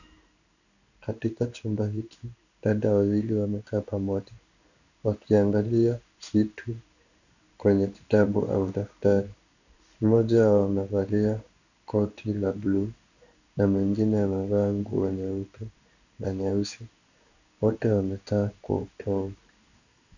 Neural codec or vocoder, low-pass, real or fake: codec, 16 kHz in and 24 kHz out, 1 kbps, XY-Tokenizer; 7.2 kHz; fake